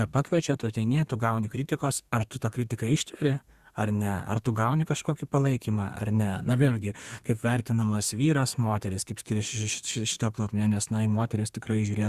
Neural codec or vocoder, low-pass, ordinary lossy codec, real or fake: codec, 32 kHz, 1.9 kbps, SNAC; 14.4 kHz; Opus, 64 kbps; fake